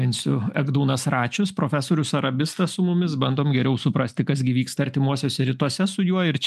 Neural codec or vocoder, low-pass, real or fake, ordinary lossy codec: none; 14.4 kHz; real; MP3, 96 kbps